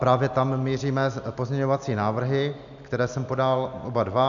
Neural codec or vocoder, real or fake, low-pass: none; real; 7.2 kHz